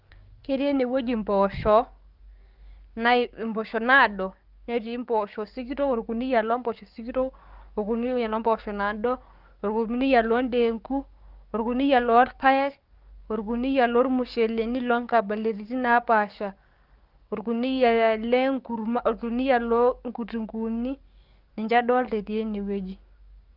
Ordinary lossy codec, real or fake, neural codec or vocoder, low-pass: Opus, 32 kbps; fake; codec, 44.1 kHz, 7.8 kbps, DAC; 5.4 kHz